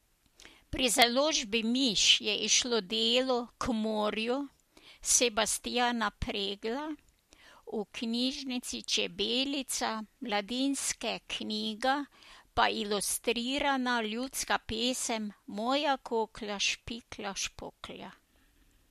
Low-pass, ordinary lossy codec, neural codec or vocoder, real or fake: 14.4 kHz; MP3, 64 kbps; none; real